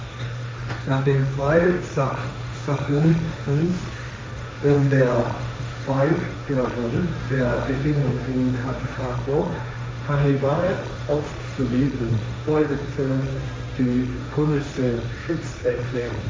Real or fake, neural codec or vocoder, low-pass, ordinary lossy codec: fake; codec, 16 kHz, 1.1 kbps, Voila-Tokenizer; none; none